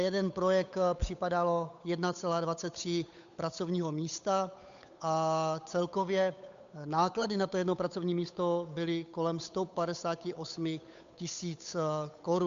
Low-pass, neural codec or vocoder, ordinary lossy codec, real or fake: 7.2 kHz; codec, 16 kHz, 8 kbps, FunCodec, trained on Chinese and English, 25 frames a second; MP3, 64 kbps; fake